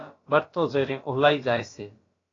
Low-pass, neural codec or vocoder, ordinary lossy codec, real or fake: 7.2 kHz; codec, 16 kHz, about 1 kbps, DyCAST, with the encoder's durations; AAC, 32 kbps; fake